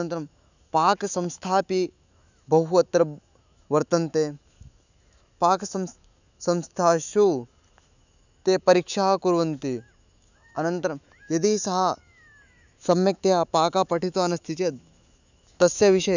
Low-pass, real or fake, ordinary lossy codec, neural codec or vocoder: 7.2 kHz; fake; none; autoencoder, 48 kHz, 128 numbers a frame, DAC-VAE, trained on Japanese speech